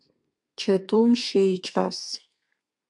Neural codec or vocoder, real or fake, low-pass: codec, 32 kHz, 1.9 kbps, SNAC; fake; 10.8 kHz